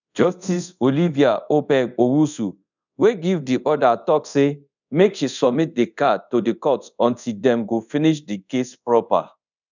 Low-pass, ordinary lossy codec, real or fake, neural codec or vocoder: 7.2 kHz; none; fake; codec, 24 kHz, 0.5 kbps, DualCodec